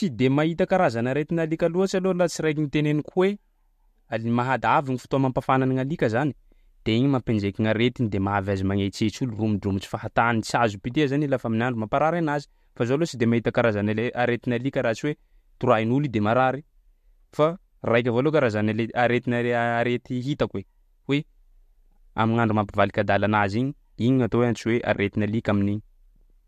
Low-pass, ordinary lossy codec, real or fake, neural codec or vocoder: 14.4 kHz; MP3, 64 kbps; real; none